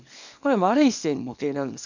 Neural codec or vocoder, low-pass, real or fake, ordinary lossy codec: codec, 24 kHz, 0.9 kbps, WavTokenizer, small release; 7.2 kHz; fake; MP3, 64 kbps